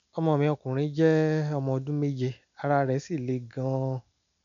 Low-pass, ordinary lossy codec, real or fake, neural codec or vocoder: 7.2 kHz; none; real; none